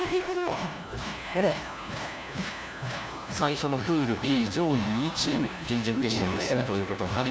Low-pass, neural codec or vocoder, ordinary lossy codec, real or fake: none; codec, 16 kHz, 1 kbps, FunCodec, trained on LibriTTS, 50 frames a second; none; fake